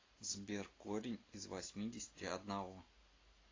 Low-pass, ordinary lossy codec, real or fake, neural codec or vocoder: 7.2 kHz; AAC, 32 kbps; real; none